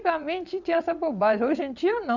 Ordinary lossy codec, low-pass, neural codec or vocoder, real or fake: none; 7.2 kHz; vocoder, 22.05 kHz, 80 mel bands, WaveNeXt; fake